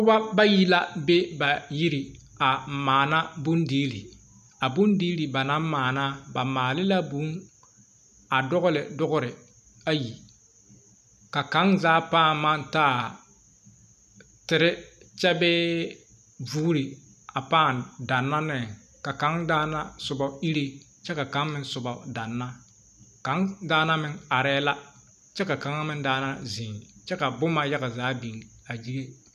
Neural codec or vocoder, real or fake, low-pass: none; real; 14.4 kHz